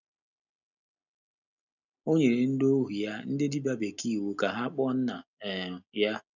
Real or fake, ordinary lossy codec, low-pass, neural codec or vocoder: real; none; 7.2 kHz; none